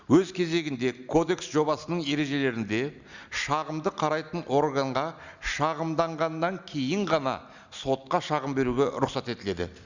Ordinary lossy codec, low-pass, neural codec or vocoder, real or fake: Opus, 64 kbps; 7.2 kHz; none; real